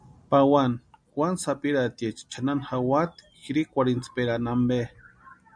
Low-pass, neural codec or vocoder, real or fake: 9.9 kHz; none; real